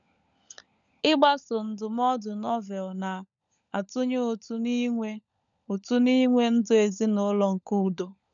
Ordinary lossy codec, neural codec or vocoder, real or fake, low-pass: none; codec, 16 kHz, 16 kbps, FunCodec, trained on LibriTTS, 50 frames a second; fake; 7.2 kHz